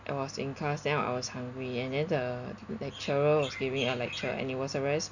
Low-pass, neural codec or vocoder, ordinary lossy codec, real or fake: 7.2 kHz; none; none; real